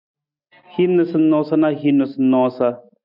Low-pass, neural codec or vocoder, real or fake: 5.4 kHz; none; real